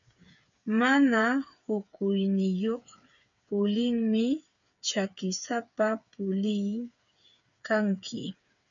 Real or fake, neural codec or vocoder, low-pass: fake; codec, 16 kHz, 8 kbps, FreqCodec, smaller model; 7.2 kHz